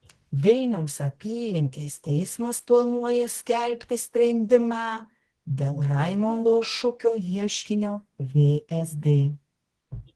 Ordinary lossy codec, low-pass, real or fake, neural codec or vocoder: Opus, 16 kbps; 10.8 kHz; fake; codec, 24 kHz, 0.9 kbps, WavTokenizer, medium music audio release